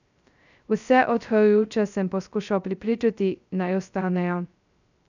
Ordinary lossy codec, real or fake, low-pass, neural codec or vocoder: none; fake; 7.2 kHz; codec, 16 kHz, 0.2 kbps, FocalCodec